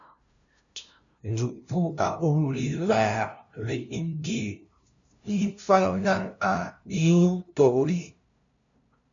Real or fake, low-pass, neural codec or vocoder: fake; 7.2 kHz; codec, 16 kHz, 0.5 kbps, FunCodec, trained on LibriTTS, 25 frames a second